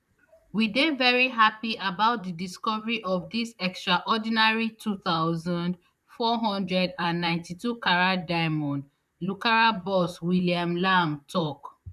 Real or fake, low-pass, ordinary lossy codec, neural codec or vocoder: fake; 14.4 kHz; none; vocoder, 44.1 kHz, 128 mel bands, Pupu-Vocoder